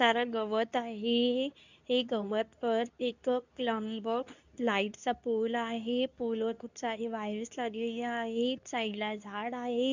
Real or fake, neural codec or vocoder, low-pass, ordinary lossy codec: fake; codec, 24 kHz, 0.9 kbps, WavTokenizer, medium speech release version 2; 7.2 kHz; none